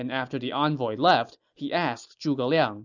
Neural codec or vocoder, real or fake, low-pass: none; real; 7.2 kHz